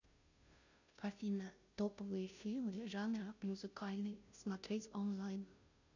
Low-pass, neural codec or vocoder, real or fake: 7.2 kHz; codec, 16 kHz, 0.5 kbps, FunCodec, trained on Chinese and English, 25 frames a second; fake